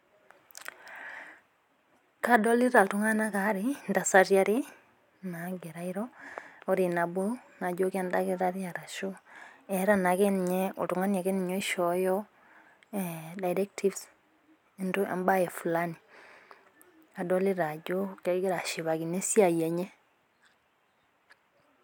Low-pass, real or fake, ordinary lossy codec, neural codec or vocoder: none; real; none; none